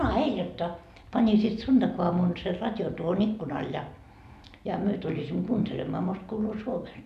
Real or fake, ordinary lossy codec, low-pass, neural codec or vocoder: real; none; 14.4 kHz; none